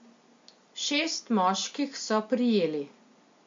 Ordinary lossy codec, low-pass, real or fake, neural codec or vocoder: MP3, 48 kbps; 7.2 kHz; real; none